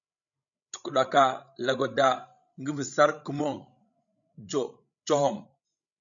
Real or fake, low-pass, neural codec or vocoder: fake; 7.2 kHz; codec, 16 kHz, 16 kbps, FreqCodec, larger model